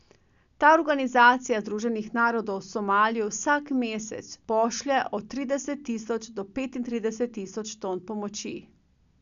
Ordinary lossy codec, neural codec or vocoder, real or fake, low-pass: none; none; real; 7.2 kHz